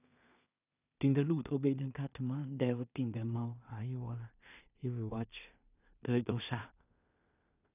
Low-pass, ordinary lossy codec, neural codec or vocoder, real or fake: 3.6 kHz; none; codec, 16 kHz in and 24 kHz out, 0.4 kbps, LongCat-Audio-Codec, two codebook decoder; fake